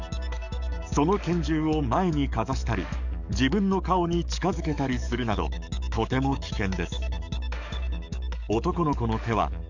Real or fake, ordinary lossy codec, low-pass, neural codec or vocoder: fake; none; 7.2 kHz; codec, 44.1 kHz, 7.8 kbps, DAC